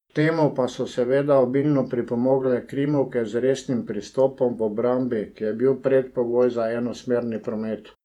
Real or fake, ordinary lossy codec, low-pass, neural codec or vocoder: fake; none; 19.8 kHz; vocoder, 48 kHz, 128 mel bands, Vocos